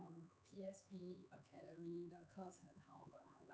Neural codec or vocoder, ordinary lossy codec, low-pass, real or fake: codec, 16 kHz, 4 kbps, X-Codec, HuBERT features, trained on LibriSpeech; none; none; fake